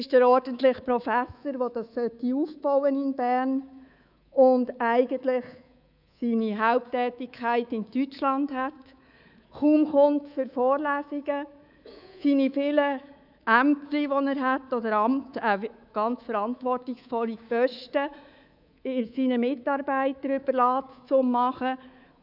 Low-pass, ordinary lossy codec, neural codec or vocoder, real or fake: 5.4 kHz; none; codec, 24 kHz, 3.1 kbps, DualCodec; fake